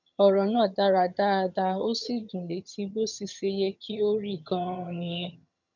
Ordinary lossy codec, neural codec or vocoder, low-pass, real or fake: none; vocoder, 22.05 kHz, 80 mel bands, HiFi-GAN; 7.2 kHz; fake